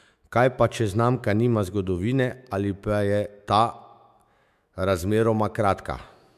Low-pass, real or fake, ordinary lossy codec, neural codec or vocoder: 14.4 kHz; fake; MP3, 96 kbps; autoencoder, 48 kHz, 128 numbers a frame, DAC-VAE, trained on Japanese speech